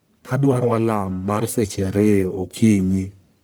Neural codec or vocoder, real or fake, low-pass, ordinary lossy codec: codec, 44.1 kHz, 1.7 kbps, Pupu-Codec; fake; none; none